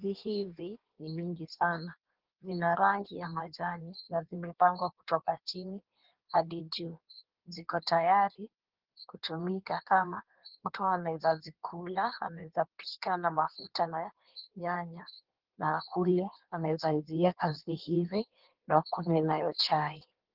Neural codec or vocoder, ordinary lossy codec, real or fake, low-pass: codec, 16 kHz in and 24 kHz out, 1.1 kbps, FireRedTTS-2 codec; Opus, 16 kbps; fake; 5.4 kHz